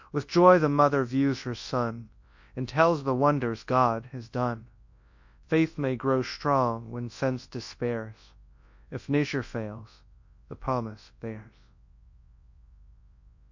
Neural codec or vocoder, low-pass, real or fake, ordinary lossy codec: codec, 24 kHz, 0.9 kbps, WavTokenizer, large speech release; 7.2 kHz; fake; MP3, 48 kbps